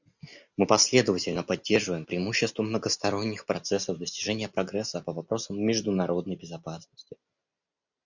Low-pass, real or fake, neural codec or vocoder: 7.2 kHz; real; none